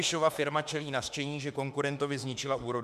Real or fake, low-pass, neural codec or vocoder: fake; 14.4 kHz; autoencoder, 48 kHz, 32 numbers a frame, DAC-VAE, trained on Japanese speech